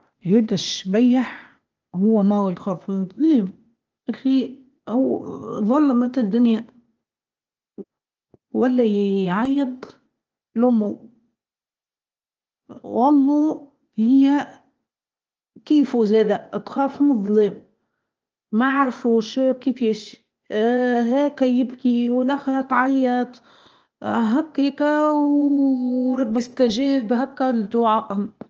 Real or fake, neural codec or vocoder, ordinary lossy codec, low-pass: fake; codec, 16 kHz, 0.8 kbps, ZipCodec; Opus, 24 kbps; 7.2 kHz